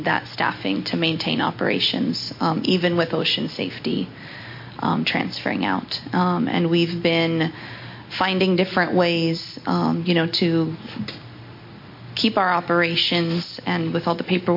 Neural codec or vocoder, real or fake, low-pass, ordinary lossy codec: none; real; 5.4 kHz; MP3, 32 kbps